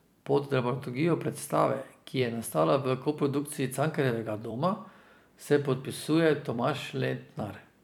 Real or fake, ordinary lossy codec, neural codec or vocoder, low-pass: fake; none; vocoder, 44.1 kHz, 128 mel bands every 256 samples, BigVGAN v2; none